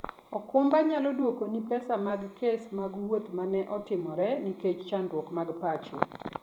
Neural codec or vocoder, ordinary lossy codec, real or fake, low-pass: vocoder, 44.1 kHz, 128 mel bands every 512 samples, BigVGAN v2; none; fake; 19.8 kHz